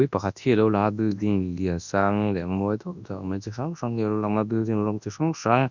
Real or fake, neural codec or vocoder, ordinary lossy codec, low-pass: fake; codec, 24 kHz, 0.9 kbps, WavTokenizer, large speech release; none; 7.2 kHz